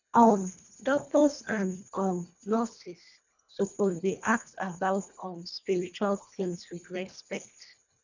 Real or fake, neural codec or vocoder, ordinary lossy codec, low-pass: fake; codec, 24 kHz, 1.5 kbps, HILCodec; none; 7.2 kHz